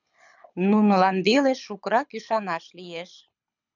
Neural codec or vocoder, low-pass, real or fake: codec, 24 kHz, 6 kbps, HILCodec; 7.2 kHz; fake